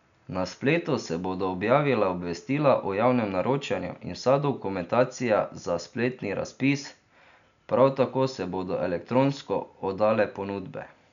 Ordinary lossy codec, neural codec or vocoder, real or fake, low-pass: none; none; real; 7.2 kHz